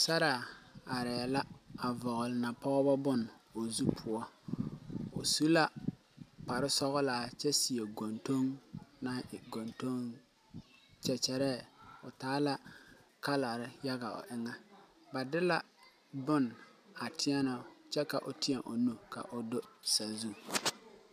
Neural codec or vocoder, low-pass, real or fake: none; 14.4 kHz; real